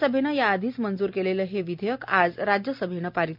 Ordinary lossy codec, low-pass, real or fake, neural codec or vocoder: MP3, 48 kbps; 5.4 kHz; real; none